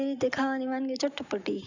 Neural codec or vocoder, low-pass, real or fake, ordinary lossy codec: none; 7.2 kHz; real; none